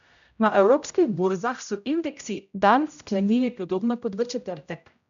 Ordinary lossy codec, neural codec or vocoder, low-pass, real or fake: none; codec, 16 kHz, 0.5 kbps, X-Codec, HuBERT features, trained on general audio; 7.2 kHz; fake